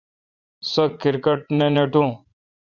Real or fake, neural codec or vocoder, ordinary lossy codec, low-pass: fake; vocoder, 44.1 kHz, 80 mel bands, Vocos; Opus, 64 kbps; 7.2 kHz